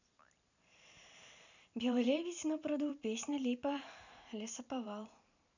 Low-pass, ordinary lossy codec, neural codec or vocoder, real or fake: 7.2 kHz; none; vocoder, 44.1 kHz, 128 mel bands every 512 samples, BigVGAN v2; fake